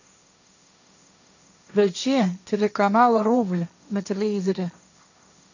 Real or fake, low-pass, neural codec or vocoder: fake; 7.2 kHz; codec, 16 kHz, 1.1 kbps, Voila-Tokenizer